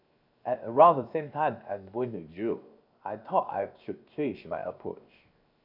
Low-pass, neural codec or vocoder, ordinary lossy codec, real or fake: 5.4 kHz; codec, 16 kHz, 0.7 kbps, FocalCodec; none; fake